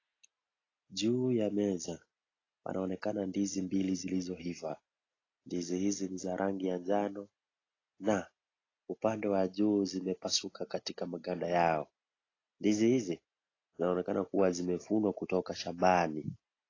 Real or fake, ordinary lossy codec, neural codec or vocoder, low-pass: real; AAC, 32 kbps; none; 7.2 kHz